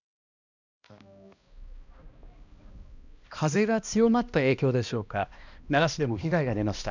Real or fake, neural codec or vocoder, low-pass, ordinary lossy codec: fake; codec, 16 kHz, 1 kbps, X-Codec, HuBERT features, trained on balanced general audio; 7.2 kHz; none